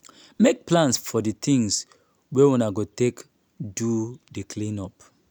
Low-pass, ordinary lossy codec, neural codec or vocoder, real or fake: none; none; none; real